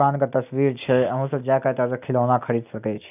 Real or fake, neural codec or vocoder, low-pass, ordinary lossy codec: real; none; 3.6 kHz; none